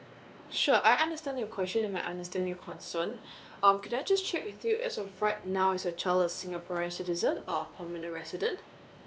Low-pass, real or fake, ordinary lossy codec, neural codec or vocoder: none; fake; none; codec, 16 kHz, 2 kbps, X-Codec, WavLM features, trained on Multilingual LibriSpeech